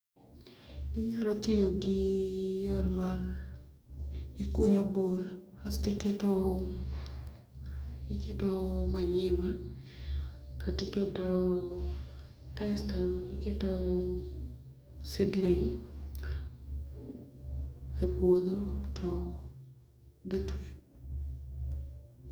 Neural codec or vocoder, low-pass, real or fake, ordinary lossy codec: codec, 44.1 kHz, 2.6 kbps, DAC; none; fake; none